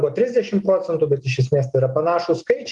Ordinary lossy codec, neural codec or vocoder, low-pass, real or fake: Opus, 24 kbps; none; 10.8 kHz; real